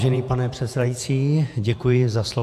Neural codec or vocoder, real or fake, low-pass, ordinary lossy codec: vocoder, 48 kHz, 128 mel bands, Vocos; fake; 14.4 kHz; AAC, 96 kbps